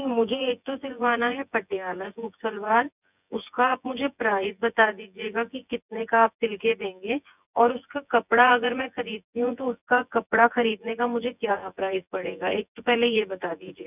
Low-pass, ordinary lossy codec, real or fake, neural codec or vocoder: 3.6 kHz; none; fake; vocoder, 24 kHz, 100 mel bands, Vocos